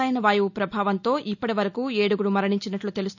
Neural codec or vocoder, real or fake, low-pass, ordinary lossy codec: none; real; 7.2 kHz; none